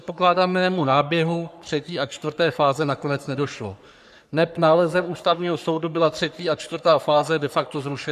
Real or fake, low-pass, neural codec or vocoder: fake; 14.4 kHz; codec, 44.1 kHz, 3.4 kbps, Pupu-Codec